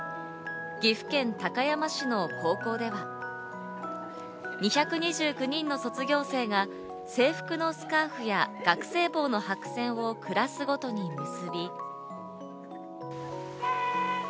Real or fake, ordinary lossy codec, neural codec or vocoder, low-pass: real; none; none; none